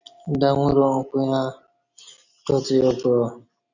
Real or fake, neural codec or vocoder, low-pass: real; none; 7.2 kHz